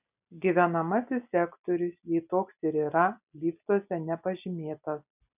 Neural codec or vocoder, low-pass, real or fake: none; 3.6 kHz; real